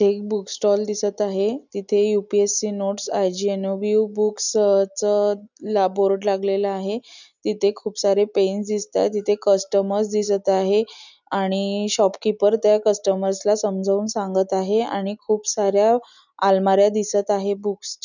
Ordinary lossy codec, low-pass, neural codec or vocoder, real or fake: none; 7.2 kHz; none; real